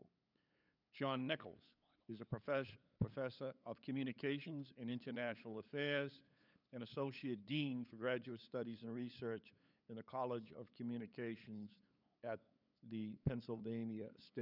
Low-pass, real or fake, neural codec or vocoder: 5.4 kHz; fake; codec, 16 kHz, 8 kbps, FunCodec, trained on LibriTTS, 25 frames a second